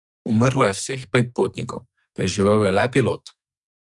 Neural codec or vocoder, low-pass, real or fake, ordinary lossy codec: codec, 44.1 kHz, 2.6 kbps, SNAC; 10.8 kHz; fake; none